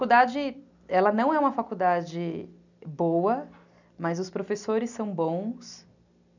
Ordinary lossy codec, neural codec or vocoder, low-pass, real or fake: none; none; 7.2 kHz; real